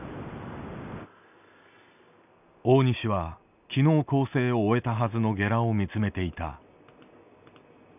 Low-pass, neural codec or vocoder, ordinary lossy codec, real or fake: 3.6 kHz; none; none; real